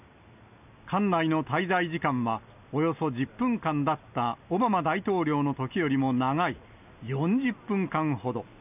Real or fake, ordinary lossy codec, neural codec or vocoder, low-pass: real; none; none; 3.6 kHz